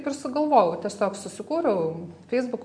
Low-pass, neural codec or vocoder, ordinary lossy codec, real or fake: 9.9 kHz; none; MP3, 64 kbps; real